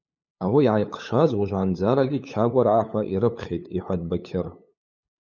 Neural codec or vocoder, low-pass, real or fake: codec, 16 kHz, 8 kbps, FunCodec, trained on LibriTTS, 25 frames a second; 7.2 kHz; fake